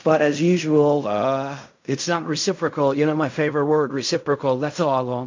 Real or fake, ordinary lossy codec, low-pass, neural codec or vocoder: fake; MP3, 48 kbps; 7.2 kHz; codec, 16 kHz in and 24 kHz out, 0.4 kbps, LongCat-Audio-Codec, fine tuned four codebook decoder